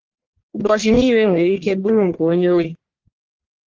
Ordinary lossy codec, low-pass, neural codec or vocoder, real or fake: Opus, 16 kbps; 7.2 kHz; codec, 44.1 kHz, 1.7 kbps, Pupu-Codec; fake